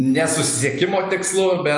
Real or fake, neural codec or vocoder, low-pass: fake; vocoder, 44.1 kHz, 128 mel bands every 256 samples, BigVGAN v2; 10.8 kHz